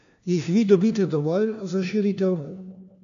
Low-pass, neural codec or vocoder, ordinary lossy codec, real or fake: 7.2 kHz; codec, 16 kHz, 1 kbps, FunCodec, trained on LibriTTS, 50 frames a second; none; fake